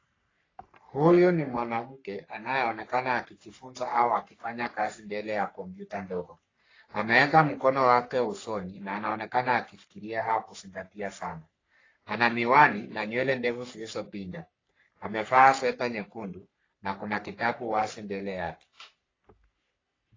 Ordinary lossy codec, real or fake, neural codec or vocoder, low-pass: AAC, 32 kbps; fake; codec, 44.1 kHz, 3.4 kbps, Pupu-Codec; 7.2 kHz